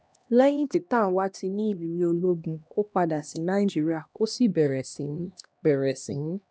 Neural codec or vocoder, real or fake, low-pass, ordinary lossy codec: codec, 16 kHz, 1 kbps, X-Codec, HuBERT features, trained on LibriSpeech; fake; none; none